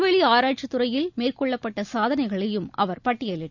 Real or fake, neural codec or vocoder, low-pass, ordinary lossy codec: real; none; 7.2 kHz; none